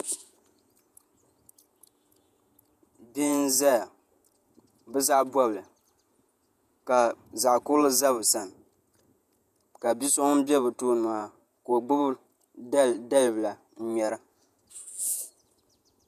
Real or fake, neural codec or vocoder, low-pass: fake; vocoder, 48 kHz, 128 mel bands, Vocos; 14.4 kHz